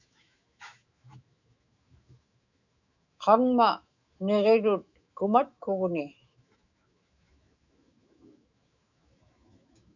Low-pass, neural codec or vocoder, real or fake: 7.2 kHz; autoencoder, 48 kHz, 128 numbers a frame, DAC-VAE, trained on Japanese speech; fake